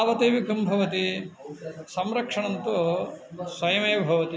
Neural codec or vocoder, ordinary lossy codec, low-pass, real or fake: none; none; none; real